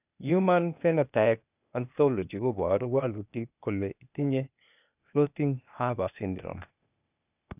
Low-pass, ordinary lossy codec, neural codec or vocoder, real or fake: 3.6 kHz; none; codec, 16 kHz, 0.8 kbps, ZipCodec; fake